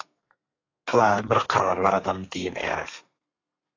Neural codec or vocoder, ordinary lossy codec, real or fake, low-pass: codec, 32 kHz, 1.9 kbps, SNAC; AAC, 32 kbps; fake; 7.2 kHz